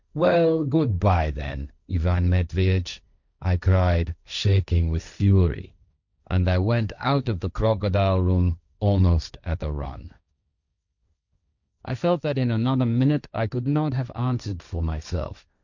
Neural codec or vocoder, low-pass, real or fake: codec, 16 kHz, 1.1 kbps, Voila-Tokenizer; 7.2 kHz; fake